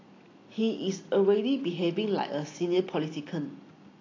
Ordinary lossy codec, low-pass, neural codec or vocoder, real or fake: AAC, 32 kbps; 7.2 kHz; none; real